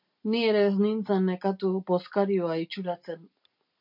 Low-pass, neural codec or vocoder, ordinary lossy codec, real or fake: 5.4 kHz; none; MP3, 48 kbps; real